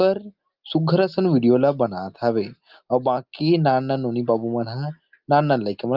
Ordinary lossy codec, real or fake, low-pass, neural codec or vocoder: Opus, 24 kbps; real; 5.4 kHz; none